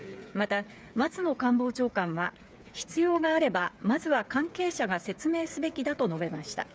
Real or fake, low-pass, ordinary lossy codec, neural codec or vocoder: fake; none; none; codec, 16 kHz, 8 kbps, FreqCodec, smaller model